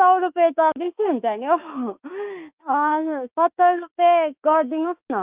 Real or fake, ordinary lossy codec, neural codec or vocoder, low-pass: fake; Opus, 24 kbps; autoencoder, 48 kHz, 32 numbers a frame, DAC-VAE, trained on Japanese speech; 3.6 kHz